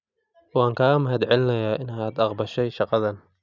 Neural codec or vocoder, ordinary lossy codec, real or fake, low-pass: none; none; real; 7.2 kHz